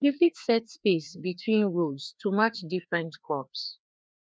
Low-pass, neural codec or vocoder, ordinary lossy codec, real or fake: none; codec, 16 kHz, 2 kbps, FreqCodec, larger model; none; fake